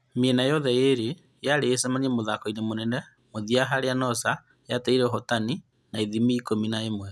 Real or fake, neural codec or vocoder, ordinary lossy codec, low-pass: real; none; none; none